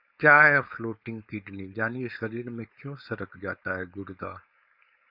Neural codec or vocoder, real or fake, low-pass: codec, 16 kHz, 4.8 kbps, FACodec; fake; 5.4 kHz